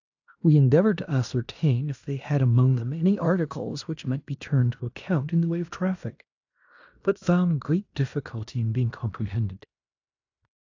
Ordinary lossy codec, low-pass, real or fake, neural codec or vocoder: AAC, 48 kbps; 7.2 kHz; fake; codec, 16 kHz in and 24 kHz out, 0.9 kbps, LongCat-Audio-Codec, fine tuned four codebook decoder